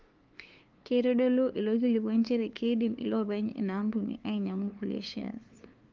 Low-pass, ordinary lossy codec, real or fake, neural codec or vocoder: 7.2 kHz; Opus, 32 kbps; fake; codec, 16 kHz, 2 kbps, FunCodec, trained on LibriTTS, 25 frames a second